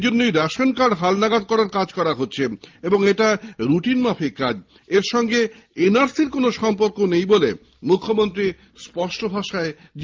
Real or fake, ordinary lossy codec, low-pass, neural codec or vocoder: real; Opus, 32 kbps; 7.2 kHz; none